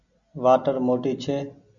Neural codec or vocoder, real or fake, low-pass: none; real; 7.2 kHz